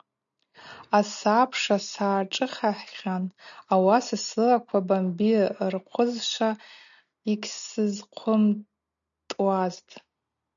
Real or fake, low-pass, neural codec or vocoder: real; 7.2 kHz; none